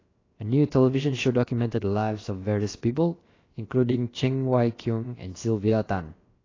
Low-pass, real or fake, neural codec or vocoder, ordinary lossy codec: 7.2 kHz; fake; codec, 16 kHz, about 1 kbps, DyCAST, with the encoder's durations; AAC, 32 kbps